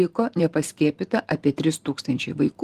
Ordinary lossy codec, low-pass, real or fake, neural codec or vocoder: Opus, 24 kbps; 14.4 kHz; fake; vocoder, 44.1 kHz, 128 mel bands every 256 samples, BigVGAN v2